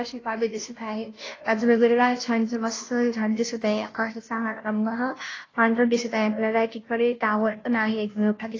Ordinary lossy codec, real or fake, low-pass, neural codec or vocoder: AAC, 32 kbps; fake; 7.2 kHz; codec, 16 kHz, 0.5 kbps, FunCodec, trained on Chinese and English, 25 frames a second